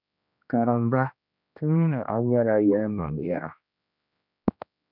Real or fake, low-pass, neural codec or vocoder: fake; 5.4 kHz; codec, 16 kHz, 1 kbps, X-Codec, HuBERT features, trained on general audio